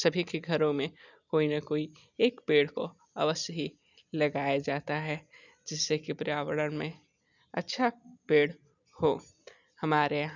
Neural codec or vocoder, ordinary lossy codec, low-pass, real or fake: none; none; 7.2 kHz; real